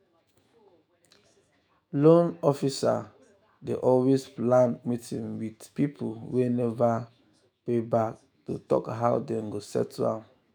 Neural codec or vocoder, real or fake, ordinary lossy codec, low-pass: autoencoder, 48 kHz, 128 numbers a frame, DAC-VAE, trained on Japanese speech; fake; none; none